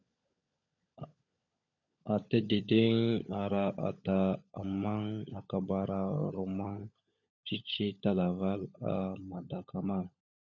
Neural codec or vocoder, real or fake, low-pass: codec, 16 kHz, 16 kbps, FunCodec, trained on LibriTTS, 50 frames a second; fake; 7.2 kHz